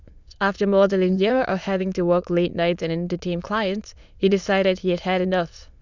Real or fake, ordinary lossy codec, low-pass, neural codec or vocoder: fake; none; 7.2 kHz; autoencoder, 22.05 kHz, a latent of 192 numbers a frame, VITS, trained on many speakers